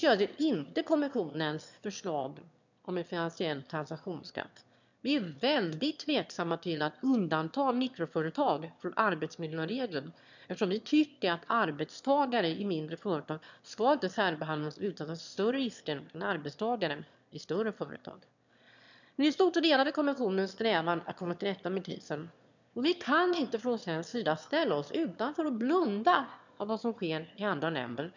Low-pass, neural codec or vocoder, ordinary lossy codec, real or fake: 7.2 kHz; autoencoder, 22.05 kHz, a latent of 192 numbers a frame, VITS, trained on one speaker; none; fake